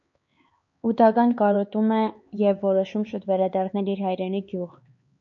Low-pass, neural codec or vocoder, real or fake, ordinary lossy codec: 7.2 kHz; codec, 16 kHz, 4 kbps, X-Codec, HuBERT features, trained on LibriSpeech; fake; MP3, 48 kbps